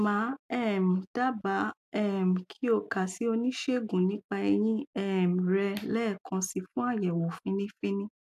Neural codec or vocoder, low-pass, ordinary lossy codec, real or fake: none; 14.4 kHz; AAC, 96 kbps; real